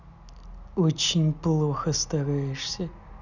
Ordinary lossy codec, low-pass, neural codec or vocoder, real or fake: none; 7.2 kHz; none; real